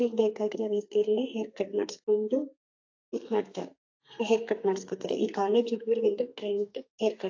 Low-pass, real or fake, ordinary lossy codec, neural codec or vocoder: 7.2 kHz; fake; AAC, 48 kbps; codec, 32 kHz, 1.9 kbps, SNAC